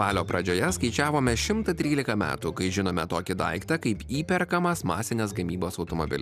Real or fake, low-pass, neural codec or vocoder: real; 14.4 kHz; none